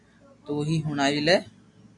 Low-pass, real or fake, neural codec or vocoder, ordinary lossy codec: 10.8 kHz; real; none; MP3, 64 kbps